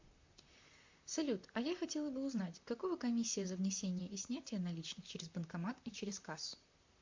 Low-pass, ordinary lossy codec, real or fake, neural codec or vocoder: 7.2 kHz; MP3, 48 kbps; fake; vocoder, 44.1 kHz, 128 mel bands, Pupu-Vocoder